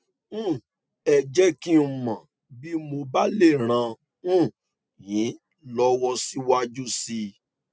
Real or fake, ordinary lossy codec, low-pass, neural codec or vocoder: real; none; none; none